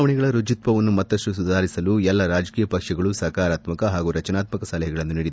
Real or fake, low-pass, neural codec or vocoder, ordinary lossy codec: real; none; none; none